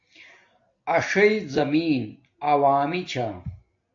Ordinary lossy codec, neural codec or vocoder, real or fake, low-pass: AAC, 48 kbps; none; real; 7.2 kHz